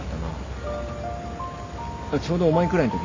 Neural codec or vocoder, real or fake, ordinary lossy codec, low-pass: none; real; AAC, 32 kbps; 7.2 kHz